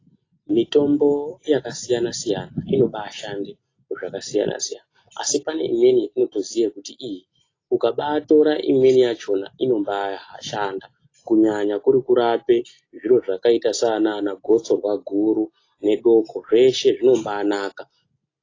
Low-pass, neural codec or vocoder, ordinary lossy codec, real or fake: 7.2 kHz; none; AAC, 32 kbps; real